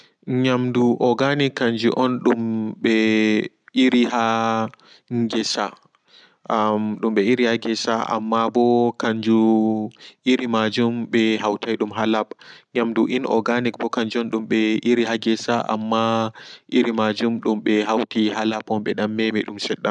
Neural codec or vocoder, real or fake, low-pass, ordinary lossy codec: none; real; 9.9 kHz; none